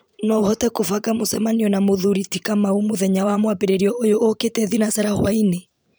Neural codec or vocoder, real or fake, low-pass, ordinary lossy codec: vocoder, 44.1 kHz, 128 mel bands every 512 samples, BigVGAN v2; fake; none; none